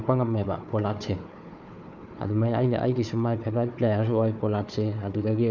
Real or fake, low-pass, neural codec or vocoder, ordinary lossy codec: fake; 7.2 kHz; codec, 16 kHz, 4 kbps, FunCodec, trained on Chinese and English, 50 frames a second; none